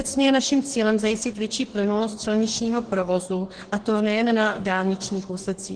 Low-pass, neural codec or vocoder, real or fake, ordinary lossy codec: 9.9 kHz; codec, 44.1 kHz, 2.6 kbps, DAC; fake; Opus, 16 kbps